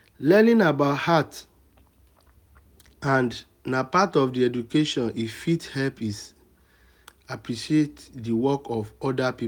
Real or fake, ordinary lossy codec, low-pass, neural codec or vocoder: real; none; 19.8 kHz; none